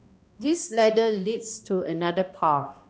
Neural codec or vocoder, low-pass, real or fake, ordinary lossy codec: codec, 16 kHz, 1 kbps, X-Codec, HuBERT features, trained on balanced general audio; none; fake; none